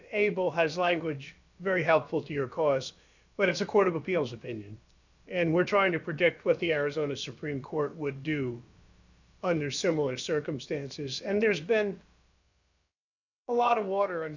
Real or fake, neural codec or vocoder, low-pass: fake; codec, 16 kHz, about 1 kbps, DyCAST, with the encoder's durations; 7.2 kHz